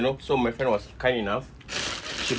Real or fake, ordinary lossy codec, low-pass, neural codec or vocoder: real; none; none; none